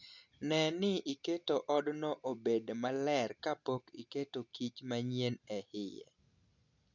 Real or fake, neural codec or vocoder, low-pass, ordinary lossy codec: real; none; 7.2 kHz; none